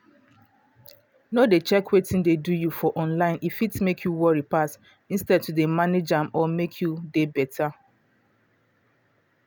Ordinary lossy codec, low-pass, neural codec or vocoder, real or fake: none; none; none; real